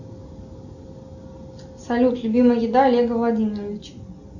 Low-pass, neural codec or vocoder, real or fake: 7.2 kHz; none; real